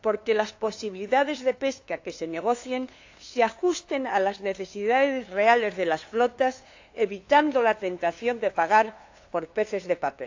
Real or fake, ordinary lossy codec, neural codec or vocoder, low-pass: fake; AAC, 48 kbps; codec, 16 kHz, 2 kbps, FunCodec, trained on LibriTTS, 25 frames a second; 7.2 kHz